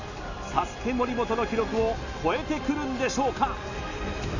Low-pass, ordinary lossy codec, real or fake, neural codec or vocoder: 7.2 kHz; none; real; none